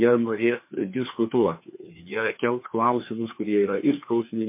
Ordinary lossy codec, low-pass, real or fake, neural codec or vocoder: MP3, 24 kbps; 3.6 kHz; fake; codec, 16 kHz, 2 kbps, FreqCodec, larger model